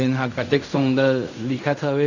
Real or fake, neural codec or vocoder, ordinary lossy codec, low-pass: fake; codec, 16 kHz in and 24 kHz out, 0.4 kbps, LongCat-Audio-Codec, fine tuned four codebook decoder; none; 7.2 kHz